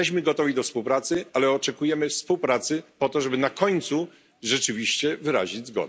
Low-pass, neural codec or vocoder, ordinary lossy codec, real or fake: none; none; none; real